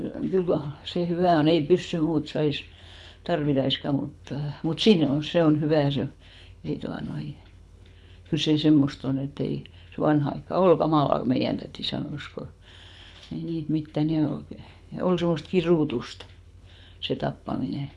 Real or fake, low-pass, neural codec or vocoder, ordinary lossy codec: fake; none; codec, 24 kHz, 6 kbps, HILCodec; none